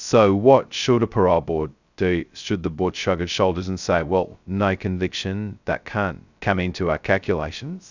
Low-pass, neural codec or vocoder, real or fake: 7.2 kHz; codec, 16 kHz, 0.2 kbps, FocalCodec; fake